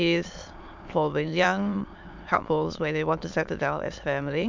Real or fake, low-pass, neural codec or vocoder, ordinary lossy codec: fake; 7.2 kHz; autoencoder, 22.05 kHz, a latent of 192 numbers a frame, VITS, trained on many speakers; MP3, 64 kbps